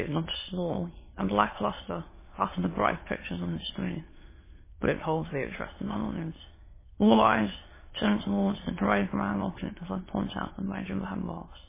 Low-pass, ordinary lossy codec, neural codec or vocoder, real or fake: 3.6 kHz; MP3, 16 kbps; autoencoder, 22.05 kHz, a latent of 192 numbers a frame, VITS, trained on many speakers; fake